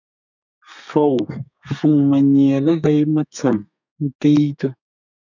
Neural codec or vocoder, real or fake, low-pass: codec, 32 kHz, 1.9 kbps, SNAC; fake; 7.2 kHz